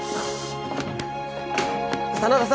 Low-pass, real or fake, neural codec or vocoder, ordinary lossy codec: none; real; none; none